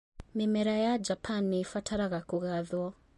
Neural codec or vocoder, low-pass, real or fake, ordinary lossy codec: none; 14.4 kHz; real; MP3, 48 kbps